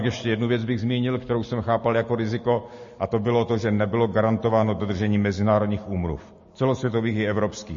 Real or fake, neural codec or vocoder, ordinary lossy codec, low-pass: real; none; MP3, 32 kbps; 7.2 kHz